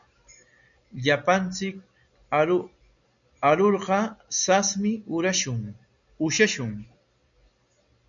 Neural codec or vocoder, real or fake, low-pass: none; real; 7.2 kHz